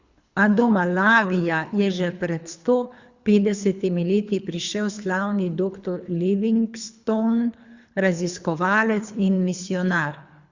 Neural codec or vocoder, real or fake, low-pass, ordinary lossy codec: codec, 24 kHz, 3 kbps, HILCodec; fake; 7.2 kHz; Opus, 64 kbps